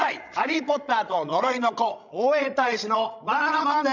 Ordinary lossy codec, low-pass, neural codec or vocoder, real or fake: none; 7.2 kHz; codec, 16 kHz, 16 kbps, FreqCodec, larger model; fake